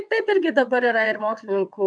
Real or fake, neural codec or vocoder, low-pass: fake; vocoder, 22.05 kHz, 80 mel bands, WaveNeXt; 9.9 kHz